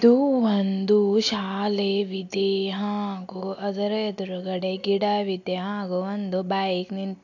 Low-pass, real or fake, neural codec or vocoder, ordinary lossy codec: 7.2 kHz; real; none; AAC, 48 kbps